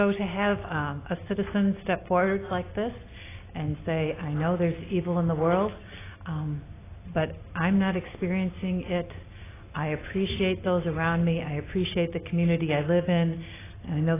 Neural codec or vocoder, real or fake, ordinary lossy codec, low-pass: none; real; AAC, 16 kbps; 3.6 kHz